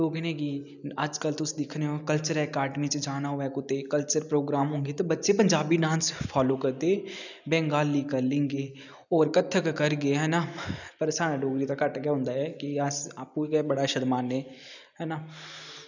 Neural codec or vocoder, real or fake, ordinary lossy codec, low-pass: none; real; none; 7.2 kHz